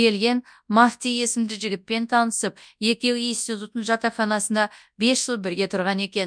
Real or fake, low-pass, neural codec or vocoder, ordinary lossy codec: fake; 9.9 kHz; codec, 24 kHz, 0.9 kbps, WavTokenizer, large speech release; none